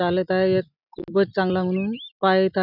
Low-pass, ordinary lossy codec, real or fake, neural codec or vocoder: 5.4 kHz; none; real; none